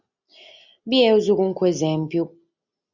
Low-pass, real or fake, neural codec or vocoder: 7.2 kHz; real; none